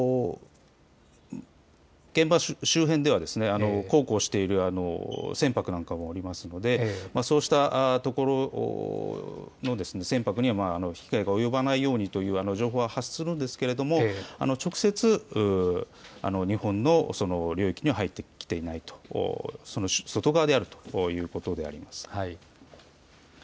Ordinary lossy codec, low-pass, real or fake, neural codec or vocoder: none; none; real; none